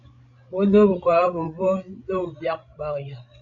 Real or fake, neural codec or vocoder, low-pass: fake; codec, 16 kHz, 16 kbps, FreqCodec, larger model; 7.2 kHz